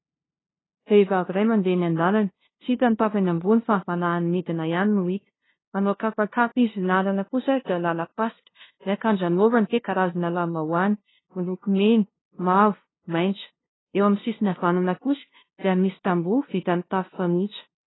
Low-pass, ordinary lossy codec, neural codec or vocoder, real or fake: 7.2 kHz; AAC, 16 kbps; codec, 16 kHz, 0.5 kbps, FunCodec, trained on LibriTTS, 25 frames a second; fake